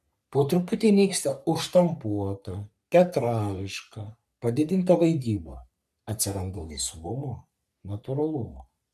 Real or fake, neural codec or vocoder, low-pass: fake; codec, 44.1 kHz, 3.4 kbps, Pupu-Codec; 14.4 kHz